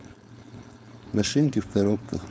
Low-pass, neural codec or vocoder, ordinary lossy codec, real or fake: none; codec, 16 kHz, 4.8 kbps, FACodec; none; fake